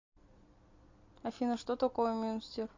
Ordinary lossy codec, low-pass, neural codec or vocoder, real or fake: MP3, 48 kbps; 7.2 kHz; none; real